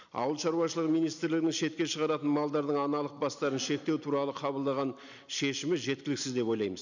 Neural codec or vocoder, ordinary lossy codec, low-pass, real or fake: none; none; 7.2 kHz; real